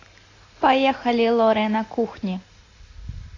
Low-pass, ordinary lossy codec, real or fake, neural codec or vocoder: 7.2 kHz; AAC, 32 kbps; real; none